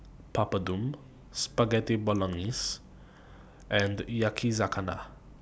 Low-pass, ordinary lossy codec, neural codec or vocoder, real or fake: none; none; none; real